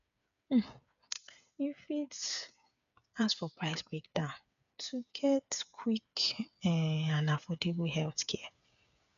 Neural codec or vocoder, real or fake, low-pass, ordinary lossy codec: codec, 16 kHz, 8 kbps, FreqCodec, smaller model; fake; 7.2 kHz; none